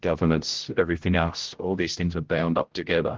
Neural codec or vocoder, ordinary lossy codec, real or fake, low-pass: codec, 16 kHz, 0.5 kbps, X-Codec, HuBERT features, trained on general audio; Opus, 16 kbps; fake; 7.2 kHz